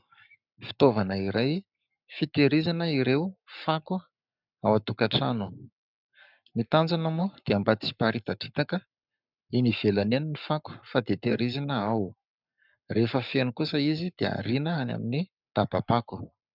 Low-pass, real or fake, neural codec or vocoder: 5.4 kHz; fake; codec, 44.1 kHz, 7.8 kbps, Pupu-Codec